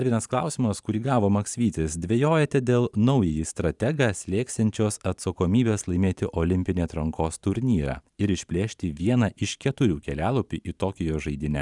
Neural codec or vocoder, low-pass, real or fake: vocoder, 44.1 kHz, 128 mel bands every 512 samples, BigVGAN v2; 10.8 kHz; fake